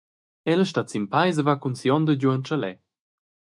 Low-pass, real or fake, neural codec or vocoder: 10.8 kHz; fake; autoencoder, 48 kHz, 128 numbers a frame, DAC-VAE, trained on Japanese speech